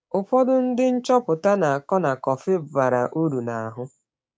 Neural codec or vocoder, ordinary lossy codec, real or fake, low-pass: codec, 16 kHz, 6 kbps, DAC; none; fake; none